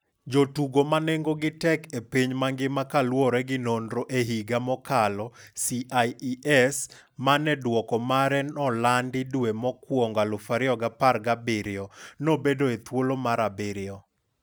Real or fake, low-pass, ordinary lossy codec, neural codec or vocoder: real; none; none; none